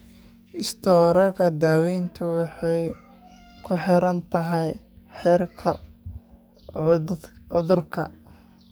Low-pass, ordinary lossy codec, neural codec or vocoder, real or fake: none; none; codec, 44.1 kHz, 2.6 kbps, SNAC; fake